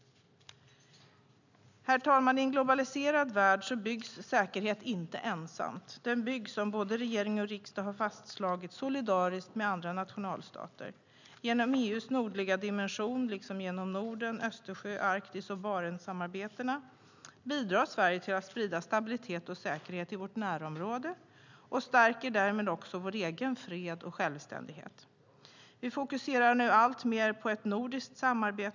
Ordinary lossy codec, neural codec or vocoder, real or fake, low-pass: none; none; real; 7.2 kHz